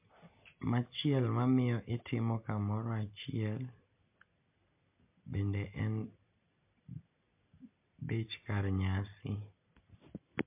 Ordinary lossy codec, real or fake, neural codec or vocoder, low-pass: MP3, 32 kbps; real; none; 3.6 kHz